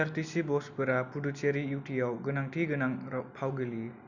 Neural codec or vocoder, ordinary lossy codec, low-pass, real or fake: none; none; 7.2 kHz; real